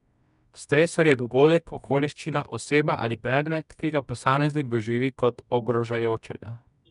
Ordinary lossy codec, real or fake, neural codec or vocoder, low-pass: none; fake; codec, 24 kHz, 0.9 kbps, WavTokenizer, medium music audio release; 10.8 kHz